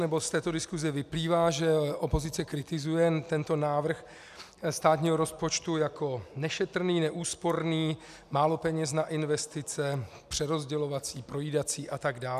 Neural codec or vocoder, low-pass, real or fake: none; 14.4 kHz; real